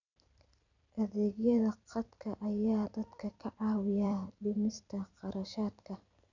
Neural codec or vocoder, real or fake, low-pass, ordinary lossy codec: vocoder, 44.1 kHz, 128 mel bands every 256 samples, BigVGAN v2; fake; 7.2 kHz; none